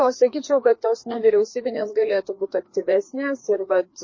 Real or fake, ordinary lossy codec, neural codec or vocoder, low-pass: fake; MP3, 32 kbps; codec, 16 kHz, 2 kbps, FreqCodec, larger model; 7.2 kHz